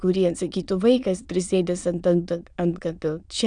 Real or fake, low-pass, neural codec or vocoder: fake; 9.9 kHz; autoencoder, 22.05 kHz, a latent of 192 numbers a frame, VITS, trained on many speakers